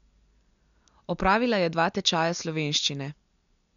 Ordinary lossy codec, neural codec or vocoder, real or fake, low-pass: none; none; real; 7.2 kHz